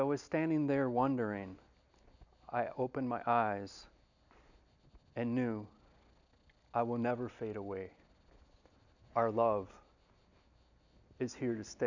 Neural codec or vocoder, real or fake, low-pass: none; real; 7.2 kHz